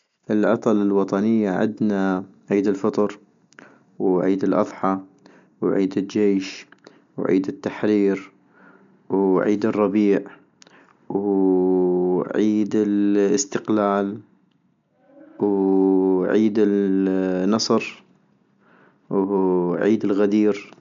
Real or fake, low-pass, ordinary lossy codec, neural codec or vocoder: real; 7.2 kHz; MP3, 64 kbps; none